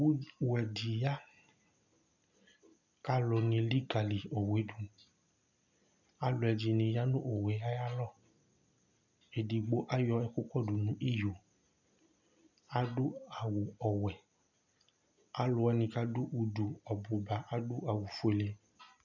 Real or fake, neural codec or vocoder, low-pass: real; none; 7.2 kHz